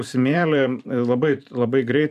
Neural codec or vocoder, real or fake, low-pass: vocoder, 44.1 kHz, 128 mel bands every 512 samples, BigVGAN v2; fake; 14.4 kHz